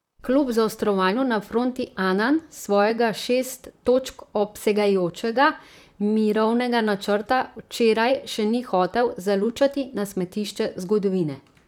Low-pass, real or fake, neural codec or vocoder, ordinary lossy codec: 19.8 kHz; fake; vocoder, 44.1 kHz, 128 mel bands, Pupu-Vocoder; none